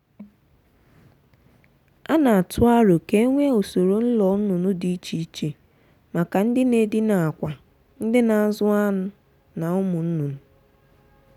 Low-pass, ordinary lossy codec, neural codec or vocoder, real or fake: 19.8 kHz; none; none; real